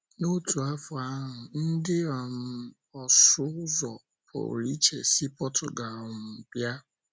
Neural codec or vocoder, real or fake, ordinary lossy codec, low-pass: none; real; none; none